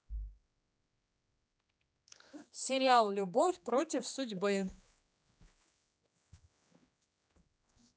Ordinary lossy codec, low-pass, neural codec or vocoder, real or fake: none; none; codec, 16 kHz, 2 kbps, X-Codec, HuBERT features, trained on general audio; fake